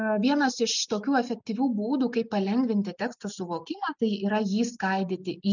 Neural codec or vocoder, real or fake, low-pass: none; real; 7.2 kHz